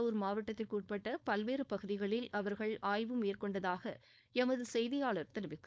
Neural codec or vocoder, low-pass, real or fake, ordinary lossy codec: codec, 16 kHz, 4.8 kbps, FACodec; none; fake; none